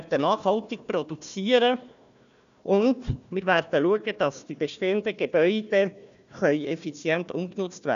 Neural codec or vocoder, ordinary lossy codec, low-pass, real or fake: codec, 16 kHz, 1 kbps, FunCodec, trained on Chinese and English, 50 frames a second; none; 7.2 kHz; fake